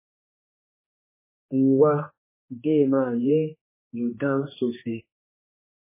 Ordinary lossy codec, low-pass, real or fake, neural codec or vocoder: MP3, 16 kbps; 3.6 kHz; fake; codec, 16 kHz, 2 kbps, X-Codec, HuBERT features, trained on general audio